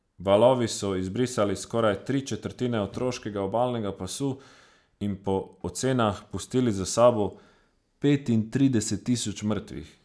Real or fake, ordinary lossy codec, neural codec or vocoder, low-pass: real; none; none; none